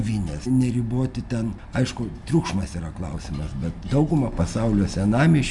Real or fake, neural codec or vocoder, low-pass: real; none; 10.8 kHz